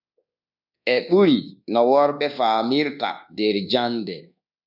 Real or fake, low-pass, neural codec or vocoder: fake; 5.4 kHz; codec, 24 kHz, 1.2 kbps, DualCodec